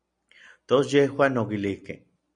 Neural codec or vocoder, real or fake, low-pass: none; real; 9.9 kHz